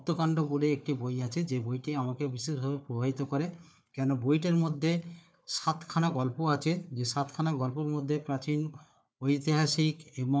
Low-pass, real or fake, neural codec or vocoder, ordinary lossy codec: none; fake; codec, 16 kHz, 4 kbps, FunCodec, trained on Chinese and English, 50 frames a second; none